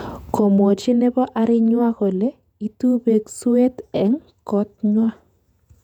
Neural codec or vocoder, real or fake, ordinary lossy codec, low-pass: vocoder, 48 kHz, 128 mel bands, Vocos; fake; none; 19.8 kHz